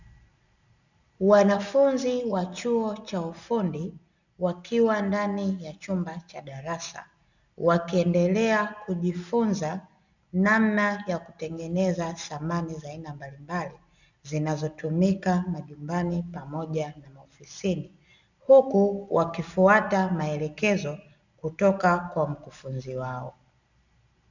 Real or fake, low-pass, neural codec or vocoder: real; 7.2 kHz; none